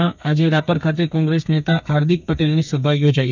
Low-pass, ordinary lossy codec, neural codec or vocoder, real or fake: 7.2 kHz; none; codec, 32 kHz, 1.9 kbps, SNAC; fake